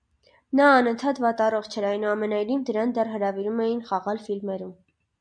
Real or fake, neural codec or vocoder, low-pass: real; none; 9.9 kHz